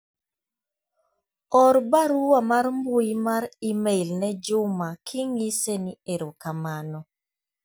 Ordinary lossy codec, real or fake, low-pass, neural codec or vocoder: none; real; none; none